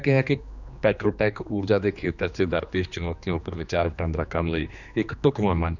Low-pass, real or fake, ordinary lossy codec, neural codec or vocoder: 7.2 kHz; fake; none; codec, 16 kHz, 2 kbps, X-Codec, HuBERT features, trained on general audio